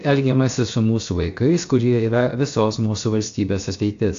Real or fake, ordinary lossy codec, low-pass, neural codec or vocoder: fake; AAC, 64 kbps; 7.2 kHz; codec, 16 kHz, 0.7 kbps, FocalCodec